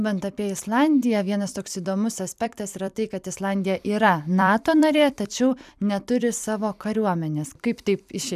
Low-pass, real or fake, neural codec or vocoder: 14.4 kHz; fake; vocoder, 44.1 kHz, 128 mel bands every 256 samples, BigVGAN v2